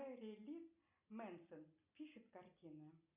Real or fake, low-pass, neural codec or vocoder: real; 3.6 kHz; none